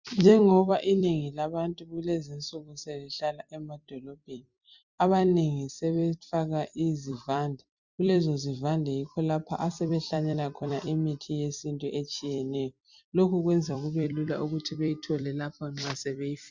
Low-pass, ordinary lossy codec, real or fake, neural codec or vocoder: 7.2 kHz; Opus, 64 kbps; fake; vocoder, 44.1 kHz, 128 mel bands every 256 samples, BigVGAN v2